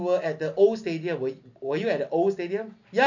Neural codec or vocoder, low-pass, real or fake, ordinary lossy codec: none; 7.2 kHz; real; none